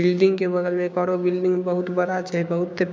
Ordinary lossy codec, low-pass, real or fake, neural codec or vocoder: none; none; fake; codec, 16 kHz, 6 kbps, DAC